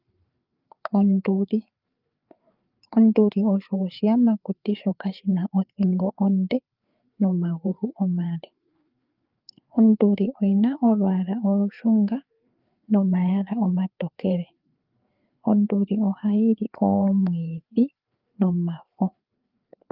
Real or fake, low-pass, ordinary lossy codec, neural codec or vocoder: fake; 5.4 kHz; Opus, 24 kbps; codec, 16 kHz, 4 kbps, FreqCodec, larger model